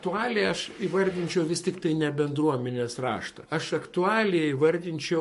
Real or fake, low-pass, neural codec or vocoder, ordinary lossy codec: fake; 14.4 kHz; codec, 44.1 kHz, 7.8 kbps, Pupu-Codec; MP3, 48 kbps